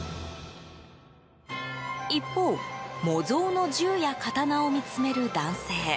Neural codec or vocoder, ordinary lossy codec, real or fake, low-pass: none; none; real; none